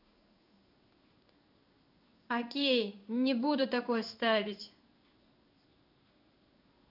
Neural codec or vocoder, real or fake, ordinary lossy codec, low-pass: codec, 16 kHz in and 24 kHz out, 1 kbps, XY-Tokenizer; fake; none; 5.4 kHz